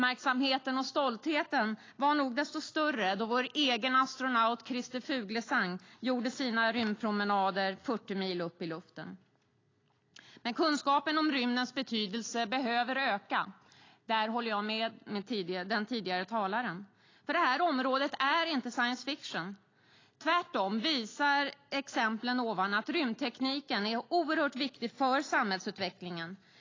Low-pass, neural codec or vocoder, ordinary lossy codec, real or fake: 7.2 kHz; none; AAC, 32 kbps; real